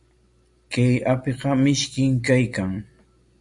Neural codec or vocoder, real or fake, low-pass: none; real; 10.8 kHz